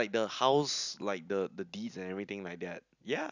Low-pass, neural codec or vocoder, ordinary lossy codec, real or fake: 7.2 kHz; none; none; real